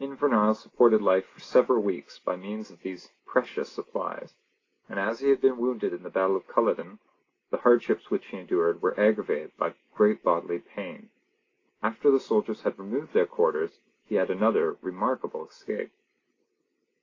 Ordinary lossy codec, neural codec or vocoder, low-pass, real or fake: AAC, 32 kbps; none; 7.2 kHz; real